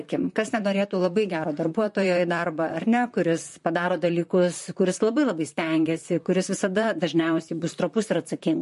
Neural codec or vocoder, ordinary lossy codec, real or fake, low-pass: vocoder, 44.1 kHz, 128 mel bands, Pupu-Vocoder; MP3, 48 kbps; fake; 14.4 kHz